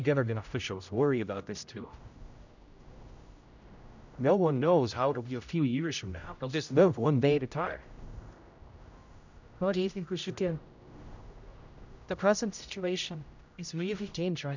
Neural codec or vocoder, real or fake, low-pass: codec, 16 kHz, 0.5 kbps, X-Codec, HuBERT features, trained on general audio; fake; 7.2 kHz